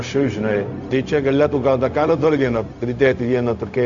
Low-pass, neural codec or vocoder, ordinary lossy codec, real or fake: 7.2 kHz; codec, 16 kHz, 0.4 kbps, LongCat-Audio-Codec; Opus, 64 kbps; fake